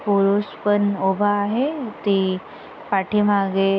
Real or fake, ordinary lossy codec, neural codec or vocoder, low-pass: real; none; none; none